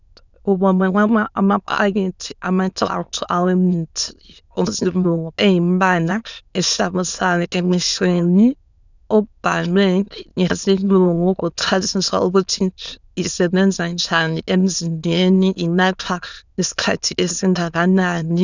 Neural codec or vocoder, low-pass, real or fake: autoencoder, 22.05 kHz, a latent of 192 numbers a frame, VITS, trained on many speakers; 7.2 kHz; fake